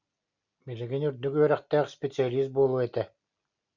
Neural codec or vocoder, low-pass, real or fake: none; 7.2 kHz; real